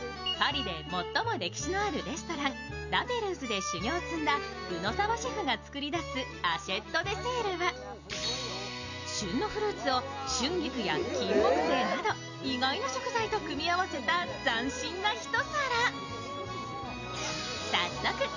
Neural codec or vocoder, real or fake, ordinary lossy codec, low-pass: none; real; none; 7.2 kHz